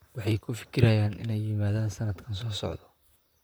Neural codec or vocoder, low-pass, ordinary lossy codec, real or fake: vocoder, 44.1 kHz, 128 mel bands, Pupu-Vocoder; none; none; fake